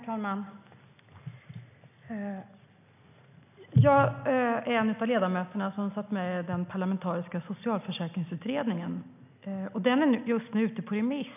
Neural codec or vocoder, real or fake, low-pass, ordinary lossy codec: none; real; 3.6 kHz; none